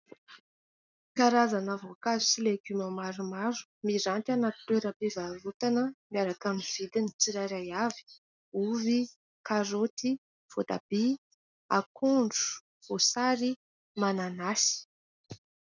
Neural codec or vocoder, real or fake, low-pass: none; real; 7.2 kHz